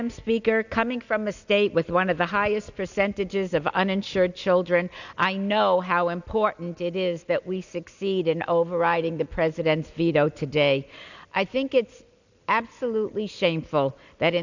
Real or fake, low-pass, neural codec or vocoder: real; 7.2 kHz; none